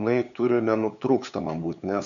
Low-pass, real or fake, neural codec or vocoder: 7.2 kHz; fake; codec, 16 kHz, 8 kbps, FunCodec, trained on LibriTTS, 25 frames a second